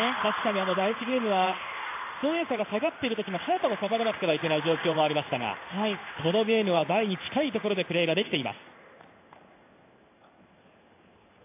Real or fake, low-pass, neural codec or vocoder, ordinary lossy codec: fake; 3.6 kHz; codec, 16 kHz in and 24 kHz out, 1 kbps, XY-Tokenizer; AAC, 24 kbps